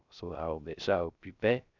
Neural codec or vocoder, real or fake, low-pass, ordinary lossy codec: codec, 16 kHz, 0.3 kbps, FocalCodec; fake; 7.2 kHz; none